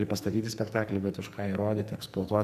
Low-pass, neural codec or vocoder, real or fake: 14.4 kHz; codec, 44.1 kHz, 2.6 kbps, SNAC; fake